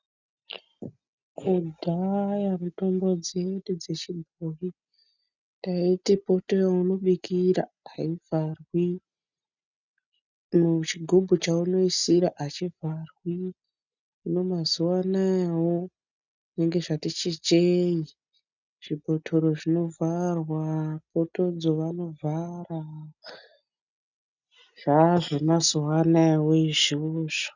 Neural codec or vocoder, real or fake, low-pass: none; real; 7.2 kHz